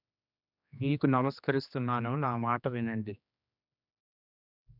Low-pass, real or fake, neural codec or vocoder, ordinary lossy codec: 5.4 kHz; fake; codec, 16 kHz, 2 kbps, X-Codec, HuBERT features, trained on general audio; none